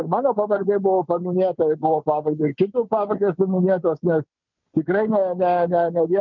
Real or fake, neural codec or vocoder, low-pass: real; none; 7.2 kHz